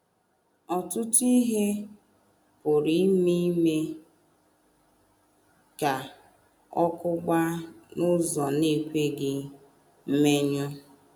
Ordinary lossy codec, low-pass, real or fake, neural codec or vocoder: none; none; real; none